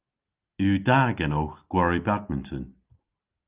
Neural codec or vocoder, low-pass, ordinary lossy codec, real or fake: none; 3.6 kHz; Opus, 32 kbps; real